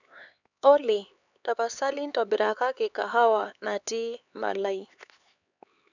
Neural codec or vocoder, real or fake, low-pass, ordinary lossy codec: codec, 16 kHz, 4 kbps, X-Codec, HuBERT features, trained on LibriSpeech; fake; 7.2 kHz; none